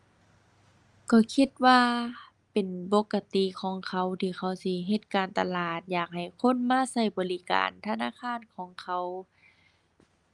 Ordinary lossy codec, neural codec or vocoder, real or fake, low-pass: Opus, 32 kbps; none; real; 10.8 kHz